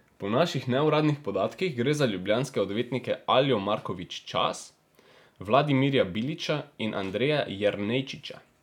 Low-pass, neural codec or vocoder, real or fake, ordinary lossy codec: 19.8 kHz; none; real; none